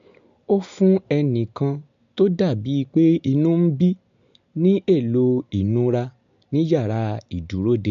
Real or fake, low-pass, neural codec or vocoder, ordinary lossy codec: real; 7.2 kHz; none; MP3, 64 kbps